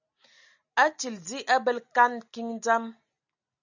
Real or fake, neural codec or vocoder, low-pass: real; none; 7.2 kHz